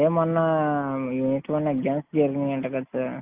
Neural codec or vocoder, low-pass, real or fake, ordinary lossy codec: none; 3.6 kHz; real; Opus, 24 kbps